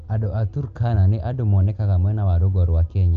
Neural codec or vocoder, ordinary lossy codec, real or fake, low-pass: none; Opus, 32 kbps; real; 7.2 kHz